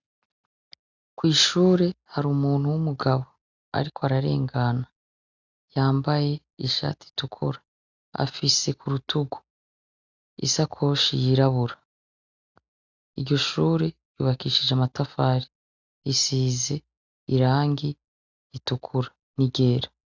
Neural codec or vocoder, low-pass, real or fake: none; 7.2 kHz; real